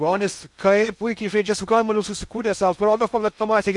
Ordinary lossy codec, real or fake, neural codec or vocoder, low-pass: MP3, 96 kbps; fake; codec, 16 kHz in and 24 kHz out, 0.6 kbps, FocalCodec, streaming, 2048 codes; 10.8 kHz